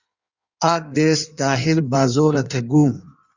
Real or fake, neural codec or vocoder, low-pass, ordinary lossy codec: fake; codec, 16 kHz in and 24 kHz out, 1.1 kbps, FireRedTTS-2 codec; 7.2 kHz; Opus, 64 kbps